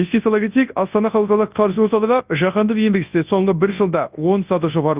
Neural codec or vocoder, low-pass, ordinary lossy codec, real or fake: codec, 24 kHz, 0.9 kbps, WavTokenizer, large speech release; 3.6 kHz; Opus, 32 kbps; fake